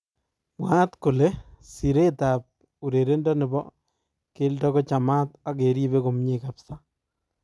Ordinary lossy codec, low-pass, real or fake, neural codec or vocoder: none; none; real; none